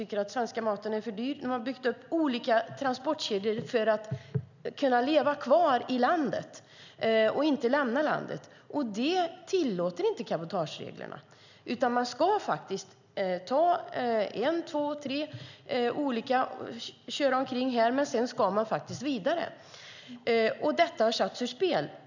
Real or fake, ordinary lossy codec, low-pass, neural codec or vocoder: real; none; 7.2 kHz; none